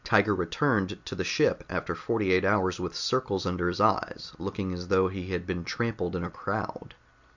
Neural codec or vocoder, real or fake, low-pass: none; real; 7.2 kHz